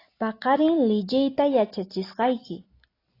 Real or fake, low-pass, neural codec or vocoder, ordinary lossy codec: real; 5.4 kHz; none; AAC, 24 kbps